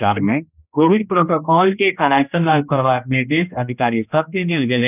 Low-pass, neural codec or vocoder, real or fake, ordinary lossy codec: 3.6 kHz; codec, 16 kHz, 1 kbps, X-Codec, HuBERT features, trained on general audio; fake; none